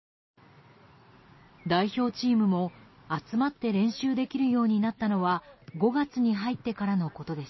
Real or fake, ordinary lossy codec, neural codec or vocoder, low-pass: real; MP3, 24 kbps; none; 7.2 kHz